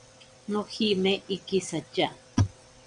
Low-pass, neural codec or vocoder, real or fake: 9.9 kHz; vocoder, 22.05 kHz, 80 mel bands, WaveNeXt; fake